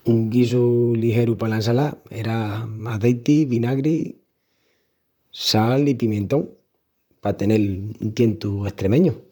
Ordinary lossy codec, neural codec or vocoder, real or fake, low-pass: none; vocoder, 44.1 kHz, 128 mel bands, Pupu-Vocoder; fake; 19.8 kHz